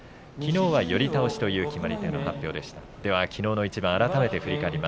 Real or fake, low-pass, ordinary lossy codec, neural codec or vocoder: real; none; none; none